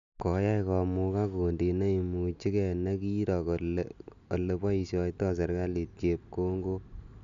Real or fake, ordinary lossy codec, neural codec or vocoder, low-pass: real; MP3, 96 kbps; none; 7.2 kHz